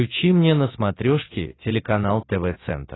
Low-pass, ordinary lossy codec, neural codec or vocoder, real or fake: 7.2 kHz; AAC, 16 kbps; none; real